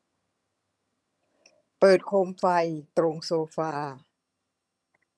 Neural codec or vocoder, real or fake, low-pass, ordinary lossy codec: vocoder, 22.05 kHz, 80 mel bands, HiFi-GAN; fake; none; none